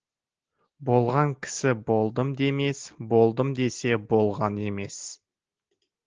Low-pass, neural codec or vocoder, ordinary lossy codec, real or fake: 7.2 kHz; none; Opus, 16 kbps; real